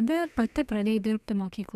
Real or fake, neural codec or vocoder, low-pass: fake; codec, 32 kHz, 1.9 kbps, SNAC; 14.4 kHz